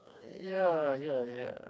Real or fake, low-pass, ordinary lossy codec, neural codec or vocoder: fake; none; none; codec, 16 kHz, 2 kbps, FreqCodec, smaller model